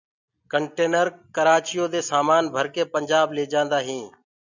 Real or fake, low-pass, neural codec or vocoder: real; 7.2 kHz; none